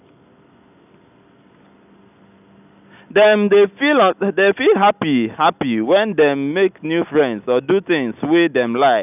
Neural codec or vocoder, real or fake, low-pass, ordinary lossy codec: none; real; 3.6 kHz; none